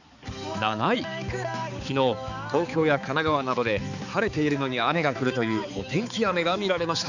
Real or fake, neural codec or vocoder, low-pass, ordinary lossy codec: fake; codec, 16 kHz, 4 kbps, X-Codec, HuBERT features, trained on balanced general audio; 7.2 kHz; none